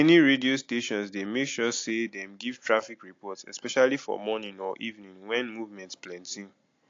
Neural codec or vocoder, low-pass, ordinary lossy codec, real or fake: none; 7.2 kHz; AAC, 48 kbps; real